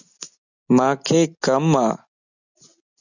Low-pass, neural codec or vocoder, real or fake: 7.2 kHz; none; real